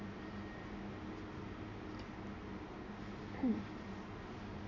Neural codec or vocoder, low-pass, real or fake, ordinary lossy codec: none; 7.2 kHz; real; none